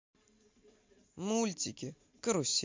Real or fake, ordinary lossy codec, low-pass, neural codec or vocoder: real; MP3, 48 kbps; 7.2 kHz; none